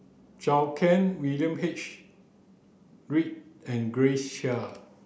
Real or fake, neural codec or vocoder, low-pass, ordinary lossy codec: real; none; none; none